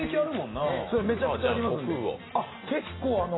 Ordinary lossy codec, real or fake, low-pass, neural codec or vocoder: AAC, 16 kbps; real; 7.2 kHz; none